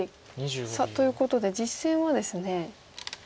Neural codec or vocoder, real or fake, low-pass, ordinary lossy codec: none; real; none; none